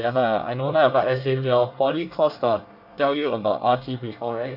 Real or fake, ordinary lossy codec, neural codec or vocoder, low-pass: fake; none; codec, 24 kHz, 1 kbps, SNAC; 5.4 kHz